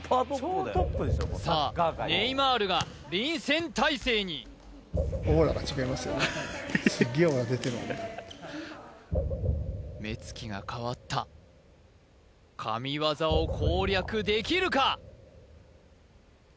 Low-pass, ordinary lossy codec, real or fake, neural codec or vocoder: none; none; real; none